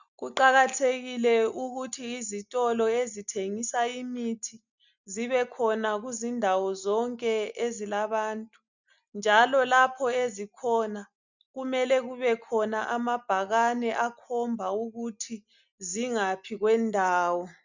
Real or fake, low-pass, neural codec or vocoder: real; 7.2 kHz; none